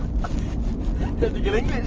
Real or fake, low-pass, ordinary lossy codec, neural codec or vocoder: real; 7.2 kHz; Opus, 24 kbps; none